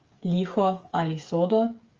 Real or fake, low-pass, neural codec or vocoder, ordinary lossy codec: fake; 7.2 kHz; codec, 16 kHz, 8 kbps, FreqCodec, smaller model; Opus, 32 kbps